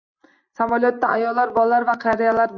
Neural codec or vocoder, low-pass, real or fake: none; 7.2 kHz; real